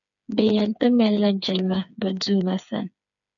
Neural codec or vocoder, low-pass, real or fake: codec, 16 kHz, 4 kbps, FreqCodec, smaller model; 7.2 kHz; fake